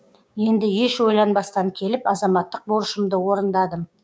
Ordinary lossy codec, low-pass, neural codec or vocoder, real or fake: none; none; codec, 16 kHz, 6 kbps, DAC; fake